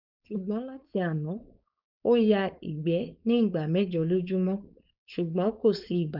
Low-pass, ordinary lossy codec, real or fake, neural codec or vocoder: 5.4 kHz; none; fake; codec, 16 kHz, 4.8 kbps, FACodec